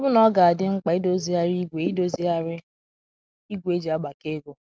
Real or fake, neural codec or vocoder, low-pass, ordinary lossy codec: real; none; none; none